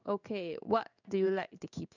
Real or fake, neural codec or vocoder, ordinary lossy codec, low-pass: fake; codec, 16 kHz in and 24 kHz out, 1 kbps, XY-Tokenizer; none; 7.2 kHz